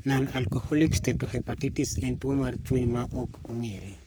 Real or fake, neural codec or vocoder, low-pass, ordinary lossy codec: fake; codec, 44.1 kHz, 3.4 kbps, Pupu-Codec; none; none